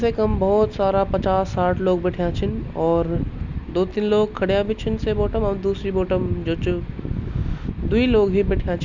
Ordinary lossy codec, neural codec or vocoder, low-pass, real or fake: Opus, 64 kbps; none; 7.2 kHz; real